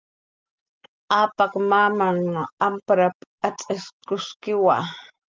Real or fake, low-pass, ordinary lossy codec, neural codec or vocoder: real; 7.2 kHz; Opus, 32 kbps; none